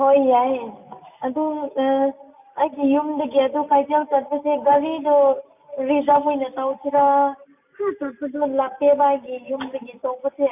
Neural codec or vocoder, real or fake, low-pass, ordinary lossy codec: none; real; 3.6 kHz; none